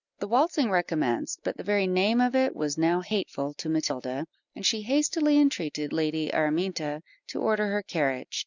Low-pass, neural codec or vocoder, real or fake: 7.2 kHz; none; real